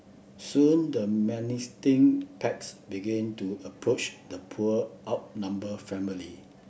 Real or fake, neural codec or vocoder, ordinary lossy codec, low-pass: real; none; none; none